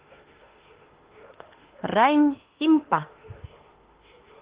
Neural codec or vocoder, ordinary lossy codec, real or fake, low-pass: autoencoder, 48 kHz, 32 numbers a frame, DAC-VAE, trained on Japanese speech; Opus, 16 kbps; fake; 3.6 kHz